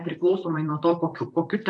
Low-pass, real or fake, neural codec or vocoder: 10.8 kHz; fake; vocoder, 44.1 kHz, 128 mel bands, Pupu-Vocoder